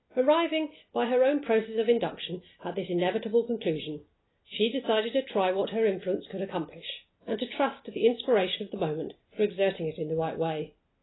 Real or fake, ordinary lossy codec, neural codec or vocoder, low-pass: real; AAC, 16 kbps; none; 7.2 kHz